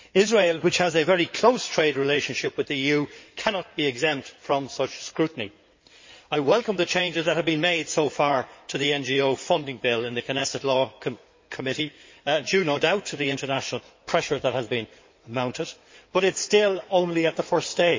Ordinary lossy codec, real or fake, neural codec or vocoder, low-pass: MP3, 32 kbps; fake; codec, 16 kHz in and 24 kHz out, 2.2 kbps, FireRedTTS-2 codec; 7.2 kHz